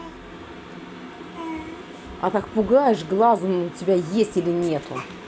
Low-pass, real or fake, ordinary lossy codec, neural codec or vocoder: none; real; none; none